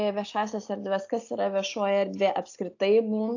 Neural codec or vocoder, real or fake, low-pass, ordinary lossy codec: none; real; 7.2 kHz; AAC, 48 kbps